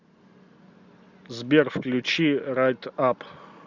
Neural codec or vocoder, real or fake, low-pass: none; real; 7.2 kHz